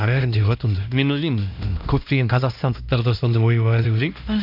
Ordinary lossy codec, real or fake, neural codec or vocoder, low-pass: none; fake; codec, 16 kHz, 1 kbps, X-Codec, HuBERT features, trained on LibriSpeech; 5.4 kHz